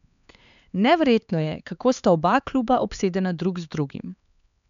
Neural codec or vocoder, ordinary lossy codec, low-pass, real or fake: codec, 16 kHz, 4 kbps, X-Codec, HuBERT features, trained on LibriSpeech; none; 7.2 kHz; fake